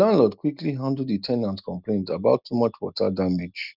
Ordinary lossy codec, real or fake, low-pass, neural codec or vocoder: none; real; 5.4 kHz; none